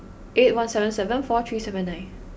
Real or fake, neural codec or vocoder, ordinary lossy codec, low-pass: real; none; none; none